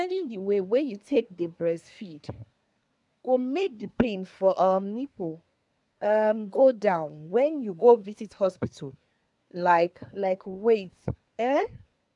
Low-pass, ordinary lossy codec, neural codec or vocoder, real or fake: 10.8 kHz; none; codec, 24 kHz, 1 kbps, SNAC; fake